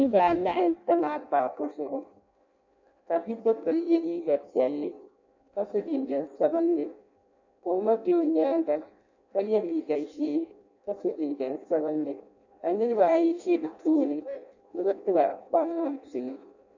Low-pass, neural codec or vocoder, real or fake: 7.2 kHz; codec, 16 kHz in and 24 kHz out, 0.6 kbps, FireRedTTS-2 codec; fake